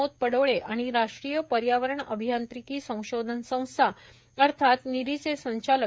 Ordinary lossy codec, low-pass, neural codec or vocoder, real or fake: none; none; codec, 16 kHz, 16 kbps, FreqCodec, smaller model; fake